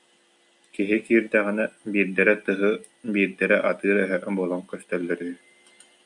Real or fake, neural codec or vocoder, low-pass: real; none; 10.8 kHz